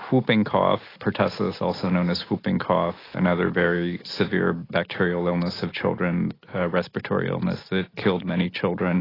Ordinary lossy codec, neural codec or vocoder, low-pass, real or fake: AAC, 24 kbps; none; 5.4 kHz; real